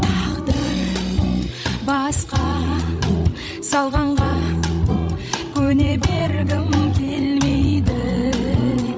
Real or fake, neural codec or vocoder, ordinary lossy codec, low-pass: fake; codec, 16 kHz, 16 kbps, FreqCodec, larger model; none; none